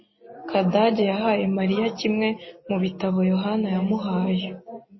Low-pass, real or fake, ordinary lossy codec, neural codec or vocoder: 7.2 kHz; real; MP3, 24 kbps; none